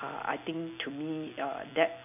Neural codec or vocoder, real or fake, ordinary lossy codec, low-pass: none; real; none; 3.6 kHz